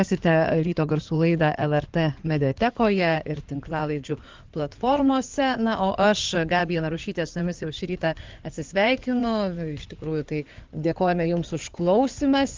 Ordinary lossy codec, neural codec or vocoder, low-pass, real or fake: Opus, 32 kbps; codec, 16 kHz in and 24 kHz out, 2.2 kbps, FireRedTTS-2 codec; 7.2 kHz; fake